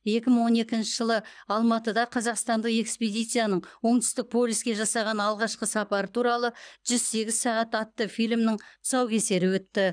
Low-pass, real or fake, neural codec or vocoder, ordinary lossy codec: 9.9 kHz; fake; codec, 24 kHz, 6 kbps, HILCodec; none